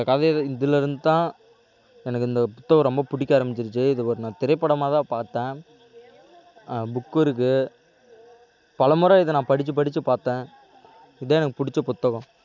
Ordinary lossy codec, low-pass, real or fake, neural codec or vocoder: none; 7.2 kHz; real; none